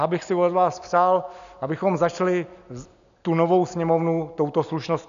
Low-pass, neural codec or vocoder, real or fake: 7.2 kHz; none; real